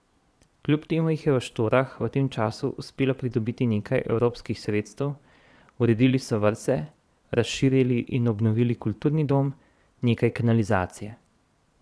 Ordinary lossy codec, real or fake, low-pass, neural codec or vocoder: none; fake; none; vocoder, 22.05 kHz, 80 mel bands, Vocos